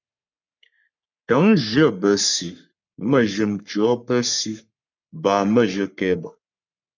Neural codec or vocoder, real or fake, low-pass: codec, 44.1 kHz, 3.4 kbps, Pupu-Codec; fake; 7.2 kHz